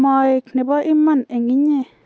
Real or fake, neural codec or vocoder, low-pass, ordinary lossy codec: real; none; none; none